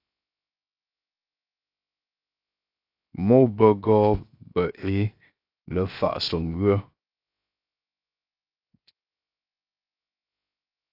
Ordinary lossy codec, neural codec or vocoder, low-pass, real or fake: AAC, 32 kbps; codec, 16 kHz, 0.7 kbps, FocalCodec; 5.4 kHz; fake